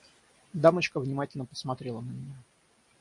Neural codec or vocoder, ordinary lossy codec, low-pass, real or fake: none; MP3, 48 kbps; 10.8 kHz; real